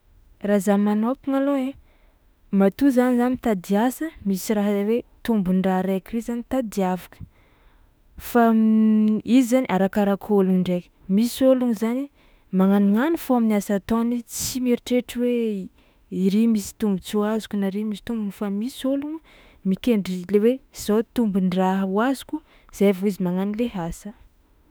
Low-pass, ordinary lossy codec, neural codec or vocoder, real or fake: none; none; autoencoder, 48 kHz, 32 numbers a frame, DAC-VAE, trained on Japanese speech; fake